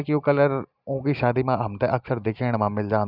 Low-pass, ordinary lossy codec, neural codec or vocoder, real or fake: 5.4 kHz; none; none; real